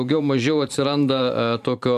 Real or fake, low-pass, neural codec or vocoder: fake; 14.4 kHz; vocoder, 44.1 kHz, 128 mel bands every 512 samples, BigVGAN v2